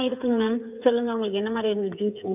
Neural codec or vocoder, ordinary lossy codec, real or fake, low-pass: codec, 16 kHz, 8 kbps, FreqCodec, smaller model; none; fake; 3.6 kHz